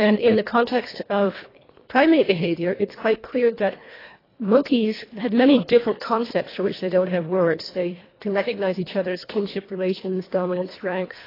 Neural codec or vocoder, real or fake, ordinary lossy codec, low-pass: codec, 24 kHz, 1.5 kbps, HILCodec; fake; AAC, 24 kbps; 5.4 kHz